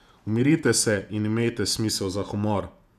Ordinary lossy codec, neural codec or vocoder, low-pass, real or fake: none; vocoder, 48 kHz, 128 mel bands, Vocos; 14.4 kHz; fake